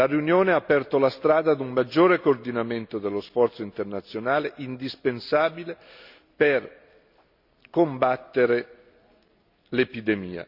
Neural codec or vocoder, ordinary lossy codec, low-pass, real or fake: none; none; 5.4 kHz; real